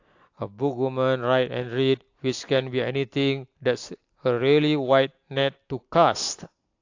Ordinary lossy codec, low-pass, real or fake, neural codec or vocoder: AAC, 48 kbps; 7.2 kHz; real; none